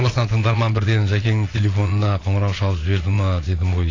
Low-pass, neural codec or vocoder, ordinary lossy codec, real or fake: 7.2 kHz; vocoder, 22.05 kHz, 80 mel bands, Vocos; AAC, 32 kbps; fake